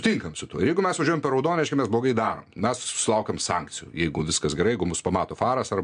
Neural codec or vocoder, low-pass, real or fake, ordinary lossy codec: none; 9.9 kHz; real; MP3, 64 kbps